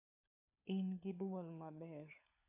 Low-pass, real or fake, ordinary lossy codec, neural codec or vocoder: 3.6 kHz; fake; AAC, 32 kbps; codec, 16 kHz, 4 kbps, FunCodec, trained on LibriTTS, 50 frames a second